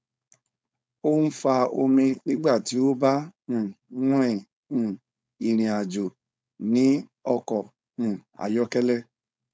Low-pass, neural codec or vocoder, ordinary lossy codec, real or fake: none; codec, 16 kHz, 4.8 kbps, FACodec; none; fake